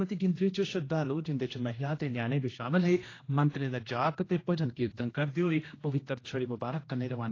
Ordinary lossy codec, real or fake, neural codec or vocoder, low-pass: AAC, 32 kbps; fake; codec, 16 kHz, 1 kbps, X-Codec, HuBERT features, trained on general audio; 7.2 kHz